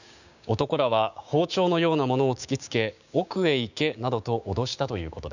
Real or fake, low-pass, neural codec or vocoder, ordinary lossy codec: fake; 7.2 kHz; codec, 16 kHz, 6 kbps, DAC; none